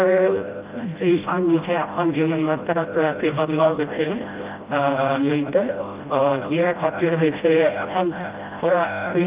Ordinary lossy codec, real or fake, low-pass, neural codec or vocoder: Opus, 24 kbps; fake; 3.6 kHz; codec, 16 kHz, 0.5 kbps, FreqCodec, smaller model